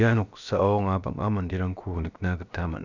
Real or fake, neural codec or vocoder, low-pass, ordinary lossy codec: fake; codec, 16 kHz, about 1 kbps, DyCAST, with the encoder's durations; 7.2 kHz; none